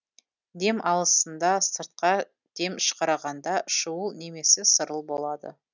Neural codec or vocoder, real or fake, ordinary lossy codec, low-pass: none; real; none; 7.2 kHz